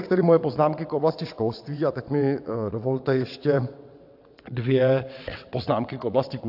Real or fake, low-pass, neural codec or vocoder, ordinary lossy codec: fake; 5.4 kHz; vocoder, 22.05 kHz, 80 mel bands, WaveNeXt; AAC, 48 kbps